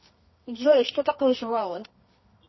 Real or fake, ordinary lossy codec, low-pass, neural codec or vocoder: fake; MP3, 24 kbps; 7.2 kHz; codec, 24 kHz, 0.9 kbps, WavTokenizer, medium music audio release